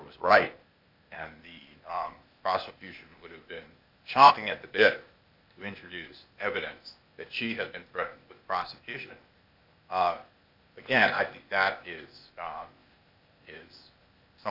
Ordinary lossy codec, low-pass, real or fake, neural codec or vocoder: MP3, 48 kbps; 5.4 kHz; fake; codec, 16 kHz, 0.8 kbps, ZipCodec